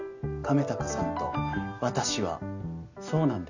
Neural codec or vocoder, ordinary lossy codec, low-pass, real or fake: none; none; 7.2 kHz; real